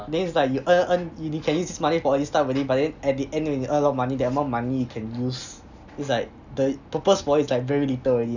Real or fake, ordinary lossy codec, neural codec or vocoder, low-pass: real; none; none; 7.2 kHz